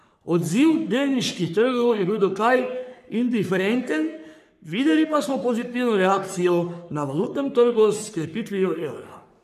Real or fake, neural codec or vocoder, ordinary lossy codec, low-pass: fake; codec, 44.1 kHz, 3.4 kbps, Pupu-Codec; none; 14.4 kHz